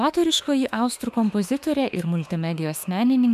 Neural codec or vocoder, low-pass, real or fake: autoencoder, 48 kHz, 32 numbers a frame, DAC-VAE, trained on Japanese speech; 14.4 kHz; fake